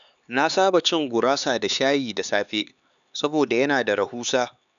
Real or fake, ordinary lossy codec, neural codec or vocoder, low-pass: fake; none; codec, 16 kHz, 4 kbps, X-Codec, HuBERT features, trained on LibriSpeech; 7.2 kHz